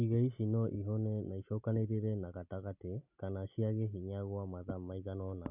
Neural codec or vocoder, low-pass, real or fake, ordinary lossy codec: none; 3.6 kHz; real; none